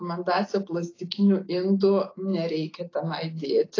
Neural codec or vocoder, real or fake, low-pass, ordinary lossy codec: none; real; 7.2 kHz; AAC, 32 kbps